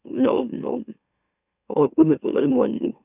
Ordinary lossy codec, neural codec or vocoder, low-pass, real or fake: none; autoencoder, 44.1 kHz, a latent of 192 numbers a frame, MeloTTS; 3.6 kHz; fake